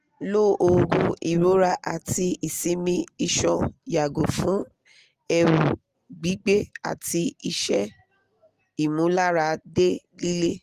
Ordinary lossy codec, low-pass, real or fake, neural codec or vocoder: Opus, 24 kbps; 14.4 kHz; real; none